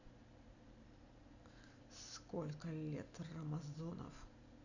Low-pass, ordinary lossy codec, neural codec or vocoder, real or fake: 7.2 kHz; none; none; real